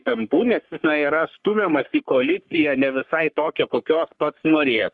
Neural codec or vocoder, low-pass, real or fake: codec, 44.1 kHz, 3.4 kbps, Pupu-Codec; 10.8 kHz; fake